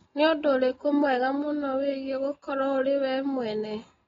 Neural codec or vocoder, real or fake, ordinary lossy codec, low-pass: none; real; AAC, 24 kbps; 7.2 kHz